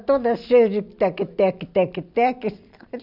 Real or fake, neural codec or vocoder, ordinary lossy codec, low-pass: real; none; none; 5.4 kHz